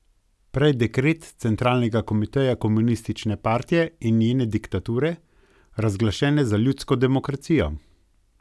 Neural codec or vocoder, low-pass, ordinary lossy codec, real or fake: none; none; none; real